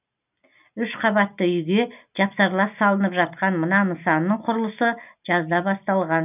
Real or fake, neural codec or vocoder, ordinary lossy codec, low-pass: real; none; none; 3.6 kHz